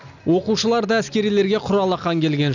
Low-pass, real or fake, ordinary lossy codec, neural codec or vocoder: 7.2 kHz; real; none; none